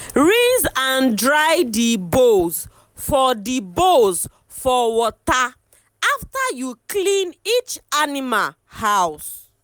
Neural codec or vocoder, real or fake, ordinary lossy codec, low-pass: none; real; none; none